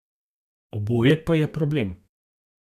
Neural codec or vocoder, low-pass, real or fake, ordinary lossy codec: codec, 32 kHz, 1.9 kbps, SNAC; 14.4 kHz; fake; none